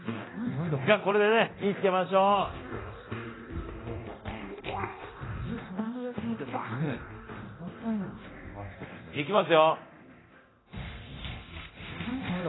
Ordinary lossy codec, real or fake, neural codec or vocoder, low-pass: AAC, 16 kbps; fake; codec, 24 kHz, 0.9 kbps, DualCodec; 7.2 kHz